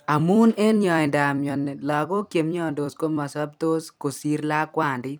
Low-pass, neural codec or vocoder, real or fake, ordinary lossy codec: none; vocoder, 44.1 kHz, 128 mel bands every 256 samples, BigVGAN v2; fake; none